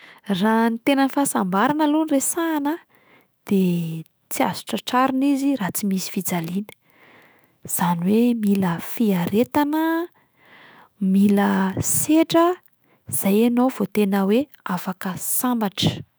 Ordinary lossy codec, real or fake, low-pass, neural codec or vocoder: none; fake; none; autoencoder, 48 kHz, 128 numbers a frame, DAC-VAE, trained on Japanese speech